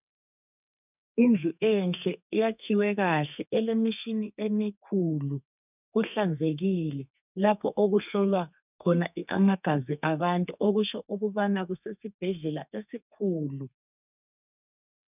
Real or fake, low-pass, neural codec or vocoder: fake; 3.6 kHz; codec, 32 kHz, 1.9 kbps, SNAC